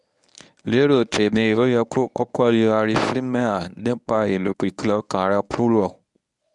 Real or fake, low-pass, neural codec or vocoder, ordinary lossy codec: fake; 10.8 kHz; codec, 24 kHz, 0.9 kbps, WavTokenizer, medium speech release version 1; none